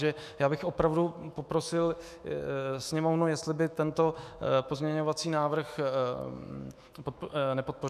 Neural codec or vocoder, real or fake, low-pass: autoencoder, 48 kHz, 128 numbers a frame, DAC-VAE, trained on Japanese speech; fake; 14.4 kHz